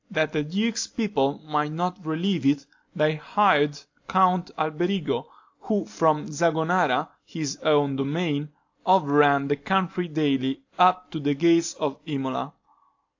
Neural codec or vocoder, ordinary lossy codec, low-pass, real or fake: none; AAC, 48 kbps; 7.2 kHz; real